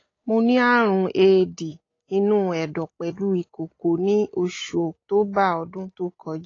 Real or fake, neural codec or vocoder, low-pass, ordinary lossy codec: real; none; 7.2 kHz; AAC, 32 kbps